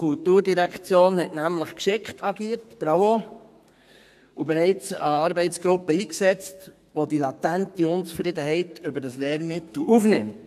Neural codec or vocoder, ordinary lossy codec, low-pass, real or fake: codec, 32 kHz, 1.9 kbps, SNAC; AAC, 96 kbps; 14.4 kHz; fake